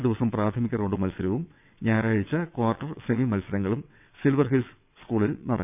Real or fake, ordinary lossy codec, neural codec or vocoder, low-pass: fake; none; vocoder, 22.05 kHz, 80 mel bands, WaveNeXt; 3.6 kHz